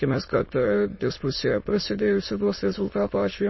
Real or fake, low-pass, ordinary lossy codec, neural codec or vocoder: fake; 7.2 kHz; MP3, 24 kbps; autoencoder, 22.05 kHz, a latent of 192 numbers a frame, VITS, trained on many speakers